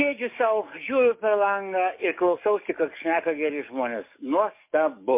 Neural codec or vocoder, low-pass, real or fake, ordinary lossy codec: codec, 44.1 kHz, 7.8 kbps, DAC; 3.6 kHz; fake; MP3, 24 kbps